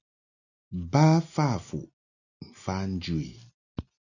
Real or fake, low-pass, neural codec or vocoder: real; 7.2 kHz; none